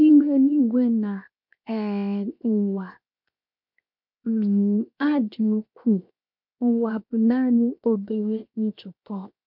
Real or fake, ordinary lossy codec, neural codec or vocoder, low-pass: fake; MP3, 48 kbps; codec, 16 kHz, 0.7 kbps, FocalCodec; 5.4 kHz